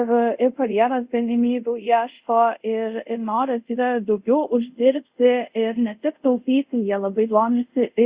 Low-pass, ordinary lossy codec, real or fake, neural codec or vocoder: 3.6 kHz; Opus, 64 kbps; fake; codec, 24 kHz, 0.5 kbps, DualCodec